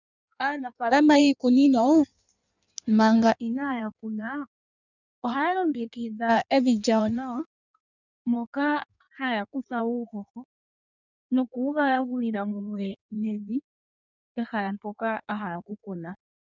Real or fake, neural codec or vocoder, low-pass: fake; codec, 16 kHz in and 24 kHz out, 1.1 kbps, FireRedTTS-2 codec; 7.2 kHz